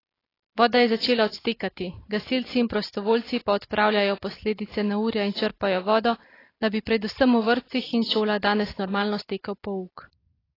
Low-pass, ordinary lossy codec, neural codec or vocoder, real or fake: 5.4 kHz; AAC, 24 kbps; none; real